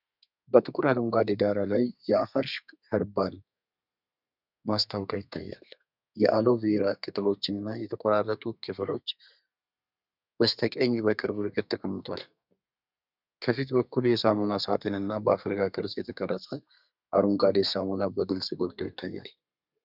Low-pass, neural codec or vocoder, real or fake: 5.4 kHz; codec, 32 kHz, 1.9 kbps, SNAC; fake